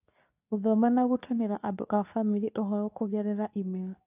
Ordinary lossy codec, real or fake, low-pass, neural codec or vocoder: AAC, 32 kbps; fake; 3.6 kHz; codec, 24 kHz, 0.9 kbps, WavTokenizer, medium speech release version 2